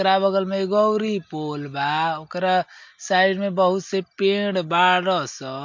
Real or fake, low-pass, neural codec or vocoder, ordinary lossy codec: real; 7.2 kHz; none; MP3, 48 kbps